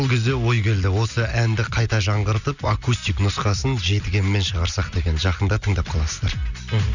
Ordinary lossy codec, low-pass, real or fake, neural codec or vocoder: none; 7.2 kHz; real; none